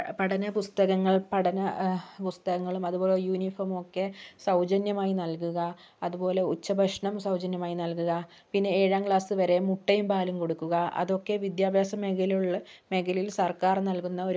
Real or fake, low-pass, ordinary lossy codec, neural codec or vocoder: real; none; none; none